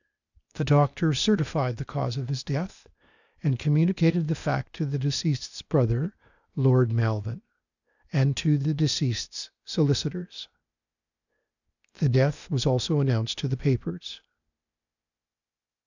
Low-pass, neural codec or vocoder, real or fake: 7.2 kHz; codec, 16 kHz, 0.8 kbps, ZipCodec; fake